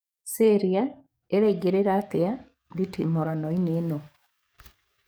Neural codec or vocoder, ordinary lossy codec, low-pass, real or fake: codec, 44.1 kHz, 7.8 kbps, DAC; none; none; fake